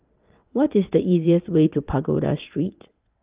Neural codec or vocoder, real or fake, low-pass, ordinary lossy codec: vocoder, 22.05 kHz, 80 mel bands, WaveNeXt; fake; 3.6 kHz; Opus, 24 kbps